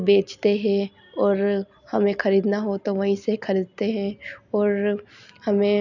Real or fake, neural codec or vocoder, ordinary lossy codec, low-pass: real; none; none; 7.2 kHz